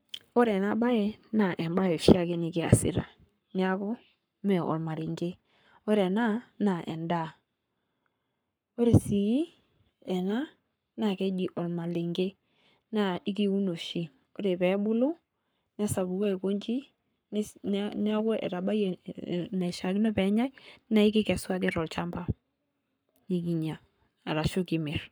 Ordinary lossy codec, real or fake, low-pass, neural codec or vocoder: none; fake; none; codec, 44.1 kHz, 7.8 kbps, Pupu-Codec